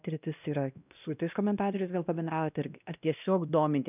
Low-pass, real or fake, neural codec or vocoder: 3.6 kHz; fake; codec, 16 kHz, 1 kbps, X-Codec, WavLM features, trained on Multilingual LibriSpeech